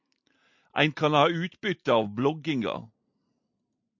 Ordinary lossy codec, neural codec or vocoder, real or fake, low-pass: MP3, 64 kbps; none; real; 7.2 kHz